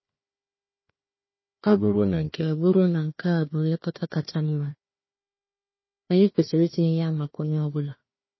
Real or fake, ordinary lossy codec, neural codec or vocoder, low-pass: fake; MP3, 24 kbps; codec, 16 kHz, 1 kbps, FunCodec, trained on Chinese and English, 50 frames a second; 7.2 kHz